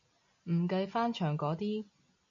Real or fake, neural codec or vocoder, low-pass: real; none; 7.2 kHz